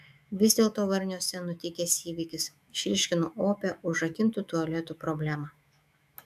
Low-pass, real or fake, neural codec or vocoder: 14.4 kHz; fake; autoencoder, 48 kHz, 128 numbers a frame, DAC-VAE, trained on Japanese speech